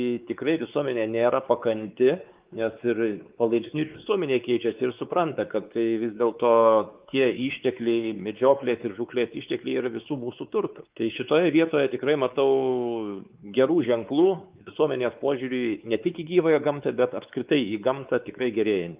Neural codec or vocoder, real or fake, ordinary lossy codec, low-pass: codec, 16 kHz, 4 kbps, X-Codec, WavLM features, trained on Multilingual LibriSpeech; fake; Opus, 24 kbps; 3.6 kHz